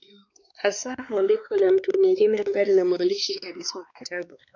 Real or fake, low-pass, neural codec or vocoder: fake; 7.2 kHz; codec, 16 kHz, 2 kbps, X-Codec, WavLM features, trained on Multilingual LibriSpeech